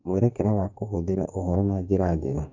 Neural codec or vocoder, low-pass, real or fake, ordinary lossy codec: codec, 44.1 kHz, 2.6 kbps, DAC; 7.2 kHz; fake; MP3, 64 kbps